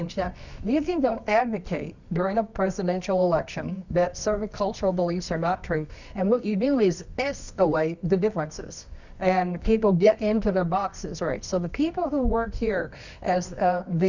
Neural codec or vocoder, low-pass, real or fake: codec, 24 kHz, 0.9 kbps, WavTokenizer, medium music audio release; 7.2 kHz; fake